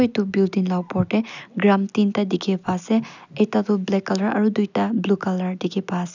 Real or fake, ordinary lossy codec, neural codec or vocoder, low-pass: real; none; none; 7.2 kHz